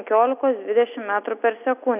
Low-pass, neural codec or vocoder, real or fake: 3.6 kHz; none; real